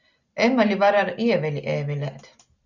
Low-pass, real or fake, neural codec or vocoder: 7.2 kHz; real; none